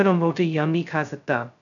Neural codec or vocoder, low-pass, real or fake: codec, 16 kHz, 0.2 kbps, FocalCodec; 7.2 kHz; fake